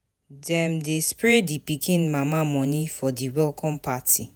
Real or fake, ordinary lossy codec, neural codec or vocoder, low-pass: fake; none; vocoder, 48 kHz, 128 mel bands, Vocos; none